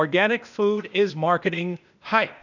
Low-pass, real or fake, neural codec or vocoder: 7.2 kHz; fake; codec, 16 kHz, 0.8 kbps, ZipCodec